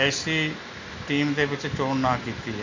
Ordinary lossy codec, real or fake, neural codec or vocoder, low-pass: AAC, 48 kbps; real; none; 7.2 kHz